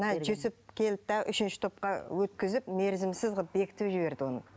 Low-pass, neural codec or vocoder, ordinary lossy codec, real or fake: none; none; none; real